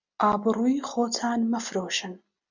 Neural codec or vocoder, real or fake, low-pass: none; real; 7.2 kHz